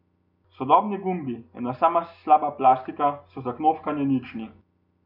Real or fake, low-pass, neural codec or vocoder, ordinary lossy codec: real; 5.4 kHz; none; none